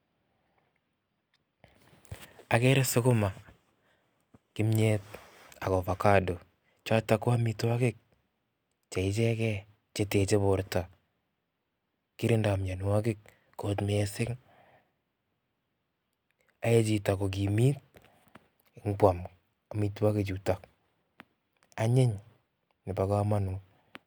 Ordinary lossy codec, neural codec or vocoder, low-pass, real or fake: none; none; none; real